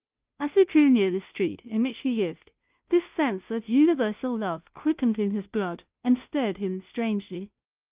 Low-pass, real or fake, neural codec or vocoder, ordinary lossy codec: 3.6 kHz; fake; codec, 16 kHz, 0.5 kbps, FunCodec, trained on Chinese and English, 25 frames a second; Opus, 24 kbps